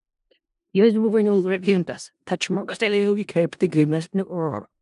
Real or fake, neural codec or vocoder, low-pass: fake; codec, 16 kHz in and 24 kHz out, 0.4 kbps, LongCat-Audio-Codec, four codebook decoder; 10.8 kHz